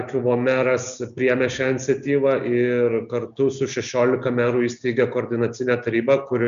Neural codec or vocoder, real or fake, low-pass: none; real; 7.2 kHz